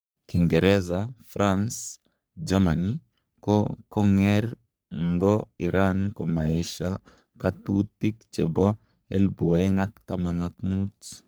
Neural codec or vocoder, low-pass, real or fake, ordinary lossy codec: codec, 44.1 kHz, 3.4 kbps, Pupu-Codec; none; fake; none